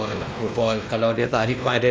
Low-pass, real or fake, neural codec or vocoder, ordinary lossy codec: none; fake; codec, 16 kHz, 1 kbps, X-Codec, WavLM features, trained on Multilingual LibriSpeech; none